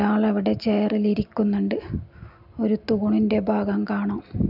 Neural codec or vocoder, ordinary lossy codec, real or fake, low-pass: none; none; real; 5.4 kHz